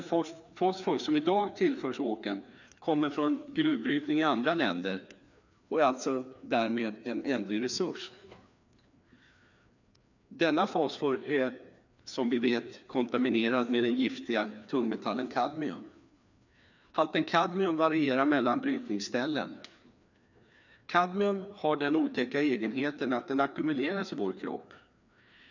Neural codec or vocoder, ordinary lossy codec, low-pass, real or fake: codec, 16 kHz, 2 kbps, FreqCodec, larger model; none; 7.2 kHz; fake